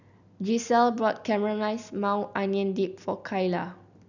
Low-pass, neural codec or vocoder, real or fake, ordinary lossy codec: 7.2 kHz; none; real; none